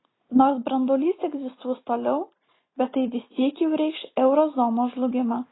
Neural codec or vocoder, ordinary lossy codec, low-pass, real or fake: none; AAC, 16 kbps; 7.2 kHz; real